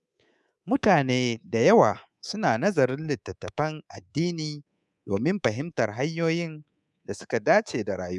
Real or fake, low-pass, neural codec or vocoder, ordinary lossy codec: fake; none; codec, 24 kHz, 3.1 kbps, DualCodec; none